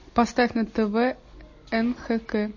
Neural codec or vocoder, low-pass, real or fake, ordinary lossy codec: none; 7.2 kHz; real; MP3, 32 kbps